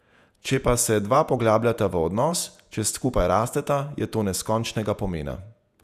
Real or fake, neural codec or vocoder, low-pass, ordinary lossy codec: fake; vocoder, 48 kHz, 128 mel bands, Vocos; 14.4 kHz; none